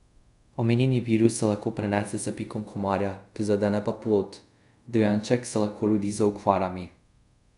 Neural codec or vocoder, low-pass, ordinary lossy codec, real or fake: codec, 24 kHz, 0.5 kbps, DualCodec; 10.8 kHz; none; fake